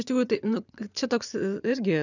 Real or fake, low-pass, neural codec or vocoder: fake; 7.2 kHz; vocoder, 24 kHz, 100 mel bands, Vocos